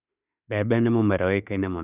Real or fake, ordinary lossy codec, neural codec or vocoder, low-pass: fake; none; autoencoder, 48 kHz, 32 numbers a frame, DAC-VAE, trained on Japanese speech; 3.6 kHz